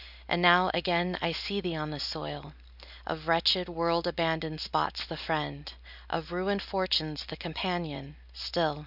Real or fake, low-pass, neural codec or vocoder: real; 5.4 kHz; none